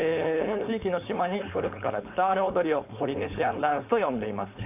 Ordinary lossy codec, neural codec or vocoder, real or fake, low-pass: AAC, 32 kbps; codec, 16 kHz, 4.8 kbps, FACodec; fake; 3.6 kHz